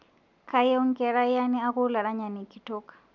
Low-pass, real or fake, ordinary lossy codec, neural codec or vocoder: 7.2 kHz; real; none; none